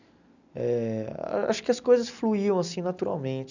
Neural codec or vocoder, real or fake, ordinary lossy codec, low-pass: none; real; none; 7.2 kHz